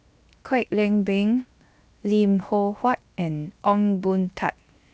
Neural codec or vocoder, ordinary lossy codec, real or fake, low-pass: codec, 16 kHz, 0.7 kbps, FocalCodec; none; fake; none